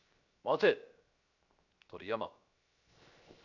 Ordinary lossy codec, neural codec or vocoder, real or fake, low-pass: none; codec, 16 kHz, 0.7 kbps, FocalCodec; fake; 7.2 kHz